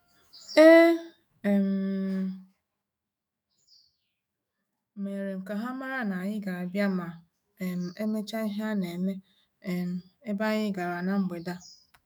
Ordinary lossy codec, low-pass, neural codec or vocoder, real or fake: none; none; autoencoder, 48 kHz, 128 numbers a frame, DAC-VAE, trained on Japanese speech; fake